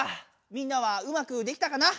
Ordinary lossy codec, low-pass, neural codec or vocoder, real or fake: none; none; none; real